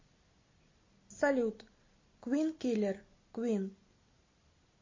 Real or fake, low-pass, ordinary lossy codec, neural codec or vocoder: real; 7.2 kHz; MP3, 32 kbps; none